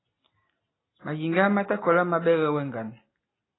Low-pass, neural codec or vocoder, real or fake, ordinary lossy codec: 7.2 kHz; none; real; AAC, 16 kbps